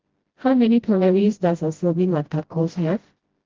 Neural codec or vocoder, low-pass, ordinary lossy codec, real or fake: codec, 16 kHz, 0.5 kbps, FreqCodec, smaller model; 7.2 kHz; Opus, 16 kbps; fake